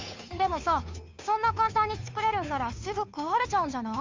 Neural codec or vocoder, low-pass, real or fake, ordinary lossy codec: codec, 16 kHz, 2 kbps, FunCodec, trained on Chinese and English, 25 frames a second; 7.2 kHz; fake; MP3, 64 kbps